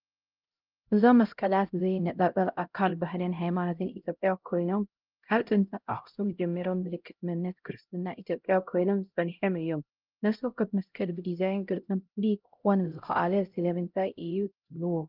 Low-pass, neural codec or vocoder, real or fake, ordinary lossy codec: 5.4 kHz; codec, 16 kHz, 0.5 kbps, X-Codec, HuBERT features, trained on LibriSpeech; fake; Opus, 32 kbps